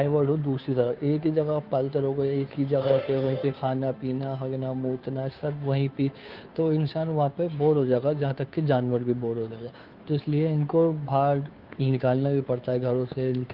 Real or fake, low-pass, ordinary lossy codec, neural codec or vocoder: fake; 5.4 kHz; Opus, 32 kbps; codec, 16 kHz, 2 kbps, FunCodec, trained on Chinese and English, 25 frames a second